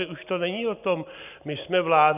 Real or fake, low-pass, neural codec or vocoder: real; 3.6 kHz; none